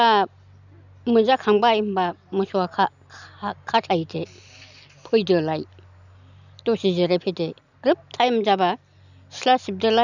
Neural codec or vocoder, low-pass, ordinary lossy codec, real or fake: none; 7.2 kHz; none; real